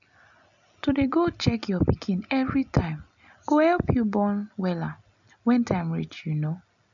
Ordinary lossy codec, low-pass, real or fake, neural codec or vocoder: none; 7.2 kHz; real; none